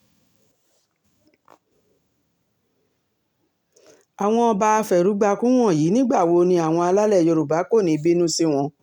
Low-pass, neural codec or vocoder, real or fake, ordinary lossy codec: 19.8 kHz; none; real; none